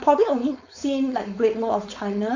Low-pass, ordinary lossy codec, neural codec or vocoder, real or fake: 7.2 kHz; AAC, 48 kbps; codec, 16 kHz, 4.8 kbps, FACodec; fake